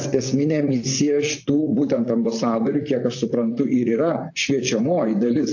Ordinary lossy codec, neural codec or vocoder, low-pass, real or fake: AAC, 48 kbps; vocoder, 44.1 kHz, 128 mel bands, Pupu-Vocoder; 7.2 kHz; fake